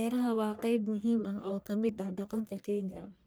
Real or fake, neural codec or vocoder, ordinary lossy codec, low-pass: fake; codec, 44.1 kHz, 1.7 kbps, Pupu-Codec; none; none